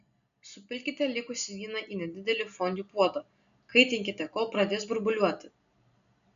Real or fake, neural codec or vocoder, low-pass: real; none; 7.2 kHz